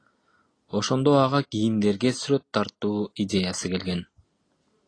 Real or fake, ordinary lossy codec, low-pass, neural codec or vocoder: real; AAC, 32 kbps; 9.9 kHz; none